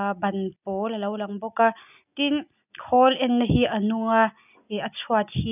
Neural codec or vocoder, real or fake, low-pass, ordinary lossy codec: none; real; 3.6 kHz; none